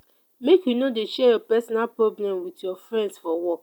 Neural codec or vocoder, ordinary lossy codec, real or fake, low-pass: none; none; real; 19.8 kHz